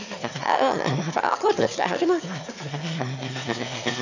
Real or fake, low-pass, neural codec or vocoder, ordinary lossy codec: fake; 7.2 kHz; autoencoder, 22.05 kHz, a latent of 192 numbers a frame, VITS, trained on one speaker; none